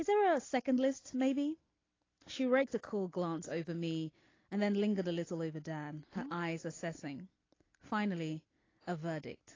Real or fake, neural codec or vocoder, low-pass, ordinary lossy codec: real; none; 7.2 kHz; AAC, 32 kbps